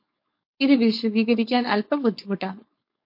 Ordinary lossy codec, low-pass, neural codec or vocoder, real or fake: MP3, 32 kbps; 5.4 kHz; codec, 16 kHz, 4.8 kbps, FACodec; fake